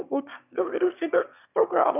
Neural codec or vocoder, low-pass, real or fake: autoencoder, 22.05 kHz, a latent of 192 numbers a frame, VITS, trained on one speaker; 3.6 kHz; fake